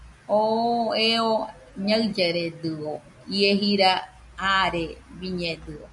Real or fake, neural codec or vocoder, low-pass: real; none; 10.8 kHz